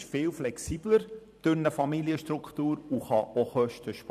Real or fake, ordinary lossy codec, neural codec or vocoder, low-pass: fake; none; vocoder, 44.1 kHz, 128 mel bands every 512 samples, BigVGAN v2; 14.4 kHz